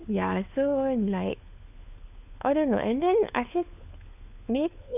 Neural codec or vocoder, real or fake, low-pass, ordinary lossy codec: codec, 16 kHz, 4 kbps, FunCodec, trained on LibriTTS, 50 frames a second; fake; 3.6 kHz; none